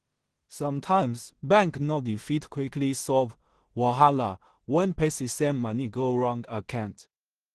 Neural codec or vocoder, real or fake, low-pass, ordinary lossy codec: codec, 16 kHz in and 24 kHz out, 0.4 kbps, LongCat-Audio-Codec, two codebook decoder; fake; 10.8 kHz; Opus, 16 kbps